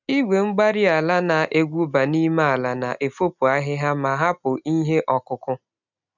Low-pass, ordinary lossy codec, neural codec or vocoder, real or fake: 7.2 kHz; none; none; real